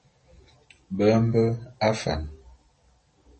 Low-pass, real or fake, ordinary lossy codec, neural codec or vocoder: 10.8 kHz; real; MP3, 32 kbps; none